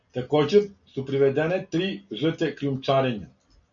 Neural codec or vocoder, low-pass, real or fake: none; 7.2 kHz; real